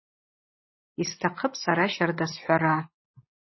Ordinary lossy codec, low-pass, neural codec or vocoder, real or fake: MP3, 24 kbps; 7.2 kHz; none; real